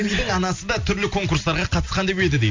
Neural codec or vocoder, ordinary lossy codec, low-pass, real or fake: none; MP3, 64 kbps; 7.2 kHz; real